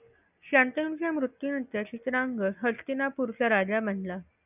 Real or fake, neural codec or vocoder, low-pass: real; none; 3.6 kHz